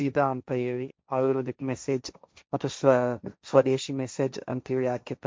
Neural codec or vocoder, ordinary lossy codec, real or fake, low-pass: codec, 16 kHz, 1.1 kbps, Voila-Tokenizer; none; fake; none